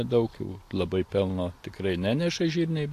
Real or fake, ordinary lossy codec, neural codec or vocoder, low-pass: real; AAC, 64 kbps; none; 14.4 kHz